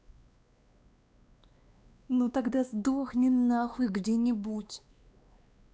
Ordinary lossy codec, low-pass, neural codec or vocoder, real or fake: none; none; codec, 16 kHz, 2 kbps, X-Codec, WavLM features, trained on Multilingual LibriSpeech; fake